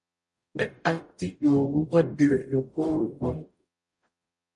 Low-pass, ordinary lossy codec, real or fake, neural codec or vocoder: 10.8 kHz; MP3, 48 kbps; fake; codec, 44.1 kHz, 0.9 kbps, DAC